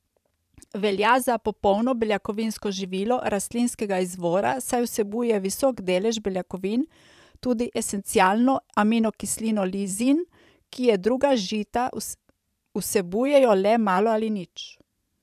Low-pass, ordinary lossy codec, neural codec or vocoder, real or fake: 14.4 kHz; none; vocoder, 44.1 kHz, 128 mel bands every 512 samples, BigVGAN v2; fake